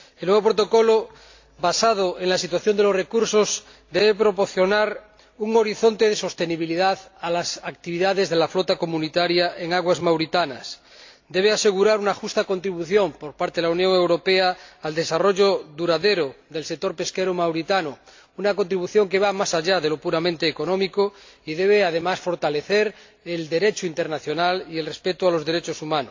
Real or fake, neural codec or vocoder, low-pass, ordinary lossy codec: real; none; 7.2 kHz; AAC, 48 kbps